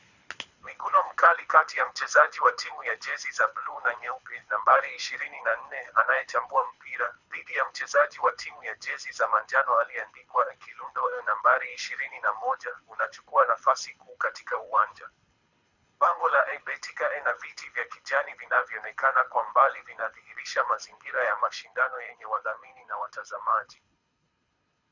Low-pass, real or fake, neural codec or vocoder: 7.2 kHz; fake; codec, 24 kHz, 6 kbps, HILCodec